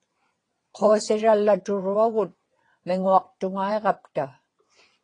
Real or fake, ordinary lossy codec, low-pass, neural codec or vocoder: fake; AAC, 32 kbps; 9.9 kHz; vocoder, 22.05 kHz, 80 mel bands, WaveNeXt